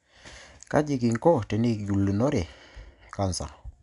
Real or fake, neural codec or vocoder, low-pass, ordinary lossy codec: real; none; 10.8 kHz; MP3, 96 kbps